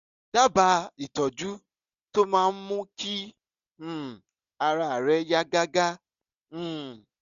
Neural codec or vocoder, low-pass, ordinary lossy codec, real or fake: none; 7.2 kHz; none; real